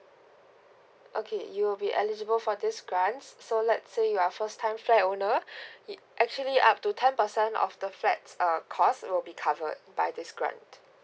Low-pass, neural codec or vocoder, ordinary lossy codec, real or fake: none; none; none; real